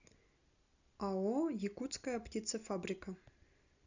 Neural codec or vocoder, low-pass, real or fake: none; 7.2 kHz; real